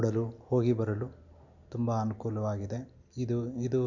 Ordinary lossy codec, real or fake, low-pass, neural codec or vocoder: none; real; 7.2 kHz; none